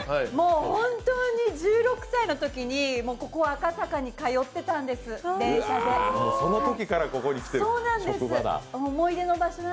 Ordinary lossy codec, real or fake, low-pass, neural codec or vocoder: none; real; none; none